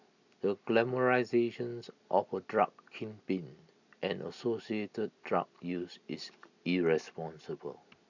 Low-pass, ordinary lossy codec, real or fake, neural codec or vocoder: 7.2 kHz; none; real; none